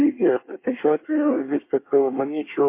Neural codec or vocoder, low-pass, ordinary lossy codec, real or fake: codec, 24 kHz, 1 kbps, SNAC; 3.6 kHz; MP3, 24 kbps; fake